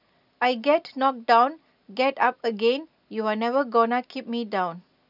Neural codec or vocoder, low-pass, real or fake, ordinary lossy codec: none; 5.4 kHz; real; none